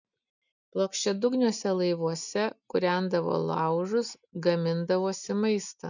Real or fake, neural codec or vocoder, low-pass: real; none; 7.2 kHz